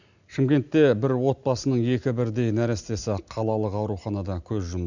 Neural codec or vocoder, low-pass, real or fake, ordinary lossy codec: none; 7.2 kHz; real; none